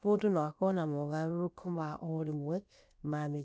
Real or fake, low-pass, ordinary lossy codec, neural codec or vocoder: fake; none; none; codec, 16 kHz, about 1 kbps, DyCAST, with the encoder's durations